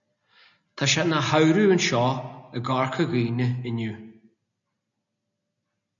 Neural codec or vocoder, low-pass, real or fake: none; 7.2 kHz; real